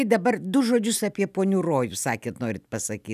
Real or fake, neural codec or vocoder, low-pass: real; none; 14.4 kHz